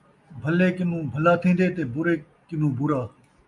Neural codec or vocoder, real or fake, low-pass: none; real; 10.8 kHz